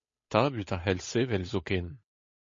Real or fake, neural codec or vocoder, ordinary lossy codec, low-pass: fake; codec, 16 kHz, 8 kbps, FunCodec, trained on Chinese and English, 25 frames a second; MP3, 32 kbps; 7.2 kHz